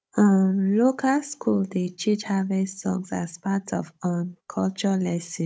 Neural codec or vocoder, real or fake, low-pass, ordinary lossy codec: codec, 16 kHz, 16 kbps, FunCodec, trained on Chinese and English, 50 frames a second; fake; none; none